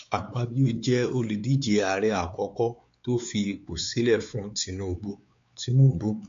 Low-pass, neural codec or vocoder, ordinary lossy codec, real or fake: 7.2 kHz; codec, 16 kHz, 4 kbps, X-Codec, WavLM features, trained on Multilingual LibriSpeech; MP3, 48 kbps; fake